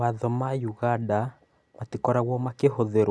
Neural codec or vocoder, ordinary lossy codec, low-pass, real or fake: none; none; none; real